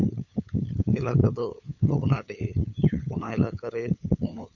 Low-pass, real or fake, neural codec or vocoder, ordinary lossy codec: 7.2 kHz; fake; codec, 16 kHz, 8 kbps, FunCodec, trained on LibriTTS, 25 frames a second; none